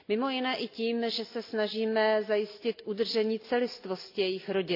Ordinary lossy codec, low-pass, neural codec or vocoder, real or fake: AAC, 32 kbps; 5.4 kHz; none; real